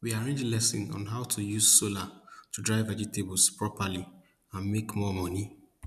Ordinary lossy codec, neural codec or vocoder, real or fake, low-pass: none; none; real; 14.4 kHz